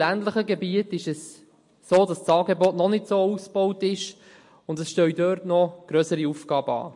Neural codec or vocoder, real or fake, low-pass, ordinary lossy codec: none; real; 10.8 kHz; MP3, 48 kbps